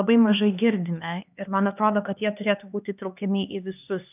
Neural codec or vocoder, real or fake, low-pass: codec, 16 kHz, 2 kbps, X-Codec, HuBERT features, trained on LibriSpeech; fake; 3.6 kHz